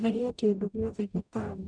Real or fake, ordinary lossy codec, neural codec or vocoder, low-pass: fake; none; codec, 44.1 kHz, 0.9 kbps, DAC; 9.9 kHz